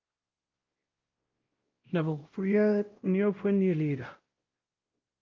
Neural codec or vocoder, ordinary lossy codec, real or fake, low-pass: codec, 16 kHz, 0.5 kbps, X-Codec, WavLM features, trained on Multilingual LibriSpeech; Opus, 32 kbps; fake; 7.2 kHz